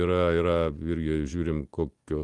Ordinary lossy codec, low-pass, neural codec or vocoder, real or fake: Opus, 24 kbps; 10.8 kHz; none; real